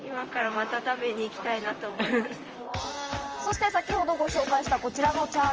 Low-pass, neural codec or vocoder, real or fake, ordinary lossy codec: 7.2 kHz; vocoder, 44.1 kHz, 128 mel bands, Pupu-Vocoder; fake; Opus, 24 kbps